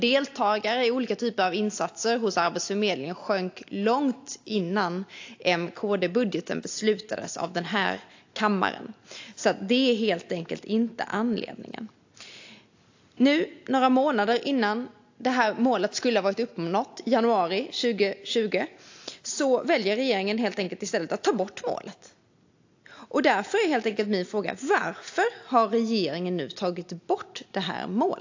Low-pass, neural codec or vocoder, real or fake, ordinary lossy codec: 7.2 kHz; none; real; AAC, 48 kbps